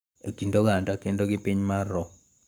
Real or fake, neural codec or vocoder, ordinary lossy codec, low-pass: fake; codec, 44.1 kHz, 7.8 kbps, Pupu-Codec; none; none